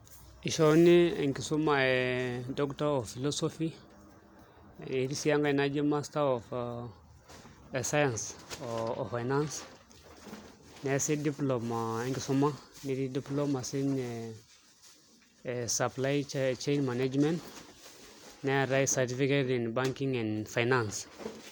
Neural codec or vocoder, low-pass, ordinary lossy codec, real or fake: none; none; none; real